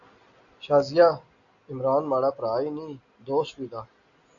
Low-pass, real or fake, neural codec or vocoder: 7.2 kHz; real; none